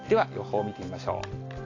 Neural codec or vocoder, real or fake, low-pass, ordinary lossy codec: none; real; 7.2 kHz; AAC, 32 kbps